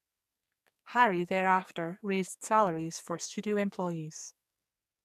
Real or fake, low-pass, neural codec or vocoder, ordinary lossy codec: fake; 14.4 kHz; codec, 44.1 kHz, 2.6 kbps, SNAC; none